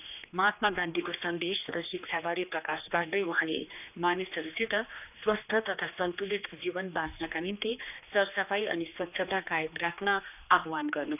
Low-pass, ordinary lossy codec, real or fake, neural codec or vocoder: 3.6 kHz; none; fake; codec, 16 kHz, 2 kbps, X-Codec, HuBERT features, trained on general audio